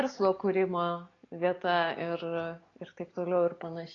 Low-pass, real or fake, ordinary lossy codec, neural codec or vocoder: 7.2 kHz; real; AAC, 32 kbps; none